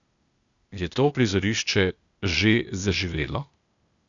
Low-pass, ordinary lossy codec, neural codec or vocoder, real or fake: 7.2 kHz; none; codec, 16 kHz, 0.8 kbps, ZipCodec; fake